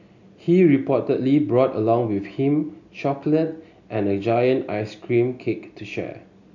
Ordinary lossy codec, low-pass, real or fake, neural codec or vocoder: none; 7.2 kHz; real; none